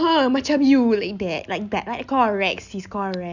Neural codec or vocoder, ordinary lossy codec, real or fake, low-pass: none; none; real; 7.2 kHz